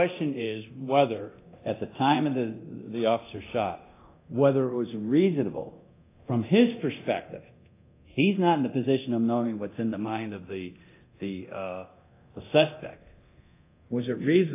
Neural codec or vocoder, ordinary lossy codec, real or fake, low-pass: codec, 24 kHz, 0.9 kbps, DualCodec; AAC, 24 kbps; fake; 3.6 kHz